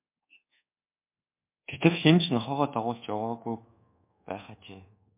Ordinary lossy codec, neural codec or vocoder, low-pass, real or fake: MP3, 32 kbps; codec, 24 kHz, 1.2 kbps, DualCodec; 3.6 kHz; fake